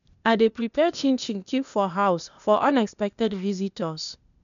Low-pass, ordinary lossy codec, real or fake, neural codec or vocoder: 7.2 kHz; none; fake; codec, 16 kHz, 0.8 kbps, ZipCodec